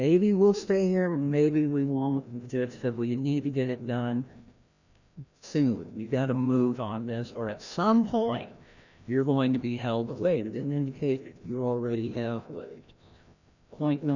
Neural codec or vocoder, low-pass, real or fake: codec, 16 kHz, 1 kbps, FreqCodec, larger model; 7.2 kHz; fake